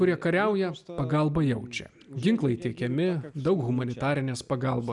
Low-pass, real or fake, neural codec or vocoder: 10.8 kHz; real; none